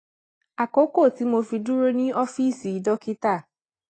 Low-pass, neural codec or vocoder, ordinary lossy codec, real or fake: 9.9 kHz; none; AAC, 32 kbps; real